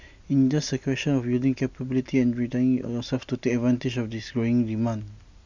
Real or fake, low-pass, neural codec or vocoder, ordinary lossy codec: real; 7.2 kHz; none; none